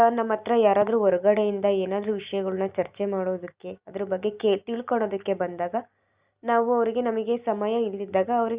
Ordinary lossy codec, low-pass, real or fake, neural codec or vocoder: Opus, 64 kbps; 3.6 kHz; real; none